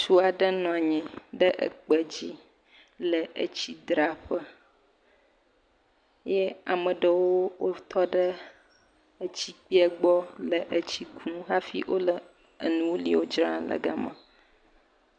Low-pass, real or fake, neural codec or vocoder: 9.9 kHz; real; none